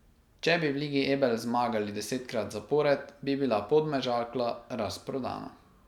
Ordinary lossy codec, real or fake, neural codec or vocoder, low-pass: none; real; none; 19.8 kHz